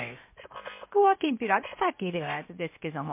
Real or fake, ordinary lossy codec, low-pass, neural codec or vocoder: fake; MP3, 24 kbps; 3.6 kHz; codec, 16 kHz, 0.7 kbps, FocalCodec